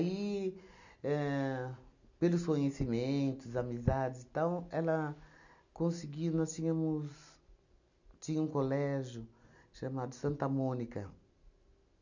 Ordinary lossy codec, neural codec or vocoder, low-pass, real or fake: none; none; 7.2 kHz; real